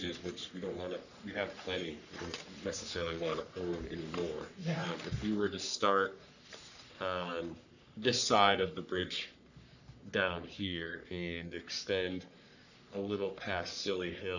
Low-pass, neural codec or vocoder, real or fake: 7.2 kHz; codec, 44.1 kHz, 3.4 kbps, Pupu-Codec; fake